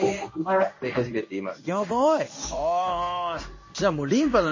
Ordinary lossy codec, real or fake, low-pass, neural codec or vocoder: MP3, 32 kbps; fake; 7.2 kHz; codec, 16 kHz in and 24 kHz out, 1 kbps, XY-Tokenizer